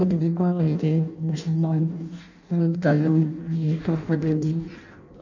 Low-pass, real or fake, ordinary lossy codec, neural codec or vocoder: 7.2 kHz; fake; none; codec, 16 kHz in and 24 kHz out, 0.6 kbps, FireRedTTS-2 codec